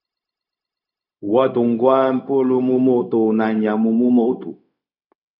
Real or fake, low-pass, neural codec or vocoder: fake; 5.4 kHz; codec, 16 kHz, 0.4 kbps, LongCat-Audio-Codec